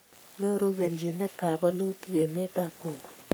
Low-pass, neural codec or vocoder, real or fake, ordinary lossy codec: none; codec, 44.1 kHz, 3.4 kbps, Pupu-Codec; fake; none